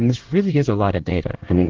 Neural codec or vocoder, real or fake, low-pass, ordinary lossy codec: codec, 24 kHz, 1 kbps, SNAC; fake; 7.2 kHz; Opus, 16 kbps